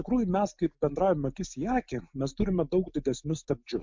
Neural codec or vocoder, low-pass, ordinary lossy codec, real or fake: none; 7.2 kHz; MP3, 64 kbps; real